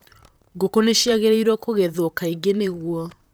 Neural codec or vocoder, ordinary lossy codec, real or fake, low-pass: vocoder, 44.1 kHz, 128 mel bands, Pupu-Vocoder; none; fake; none